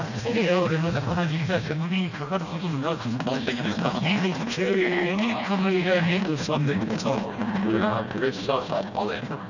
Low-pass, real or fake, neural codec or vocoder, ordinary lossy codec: 7.2 kHz; fake; codec, 16 kHz, 1 kbps, FreqCodec, smaller model; none